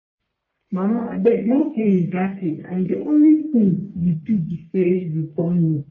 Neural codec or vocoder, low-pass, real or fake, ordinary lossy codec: codec, 44.1 kHz, 1.7 kbps, Pupu-Codec; 7.2 kHz; fake; MP3, 32 kbps